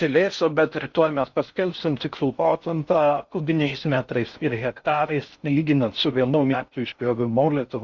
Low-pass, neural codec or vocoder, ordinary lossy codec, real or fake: 7.2 kHz; codec, 16 kHz in and 24 kHz out, 0.6 kbps, FocalCodec, streaming, 4096 codes; Opus, 64 kbps; fake